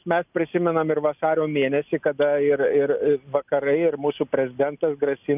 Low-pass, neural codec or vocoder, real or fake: 3.6 kHz; none; real